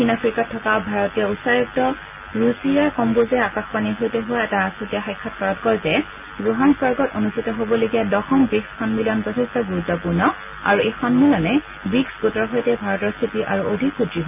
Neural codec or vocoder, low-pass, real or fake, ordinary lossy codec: none; 3.6 kHz; real; none